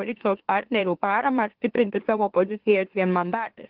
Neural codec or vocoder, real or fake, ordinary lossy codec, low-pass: autoencoder, 44.1 kHz, a latent of 192 numbers a frame, MeloTTS; fake; Opus, 16 kbps; 5.4 kHz